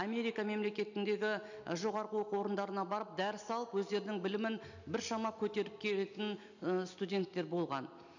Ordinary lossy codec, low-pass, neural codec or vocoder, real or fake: none; 7.2 kHz; none; real